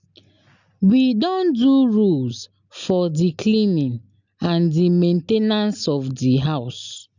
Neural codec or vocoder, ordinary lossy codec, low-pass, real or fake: none; none; 7.2 kHz; real